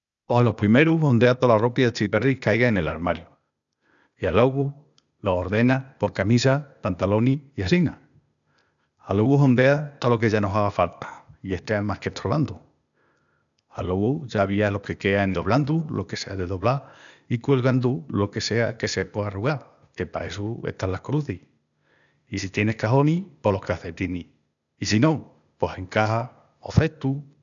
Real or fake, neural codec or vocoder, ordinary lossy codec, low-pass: fake; codec, 16 kHz, 0.8 kbps, ZipCodec; none; 7.2 kHz